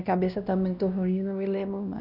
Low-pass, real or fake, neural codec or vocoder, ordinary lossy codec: 5.4 kHz; fake; codec, 16 kHz, 1 kbps, X-Codec, WavLM features, trained on Multilingual LibriSpeech; none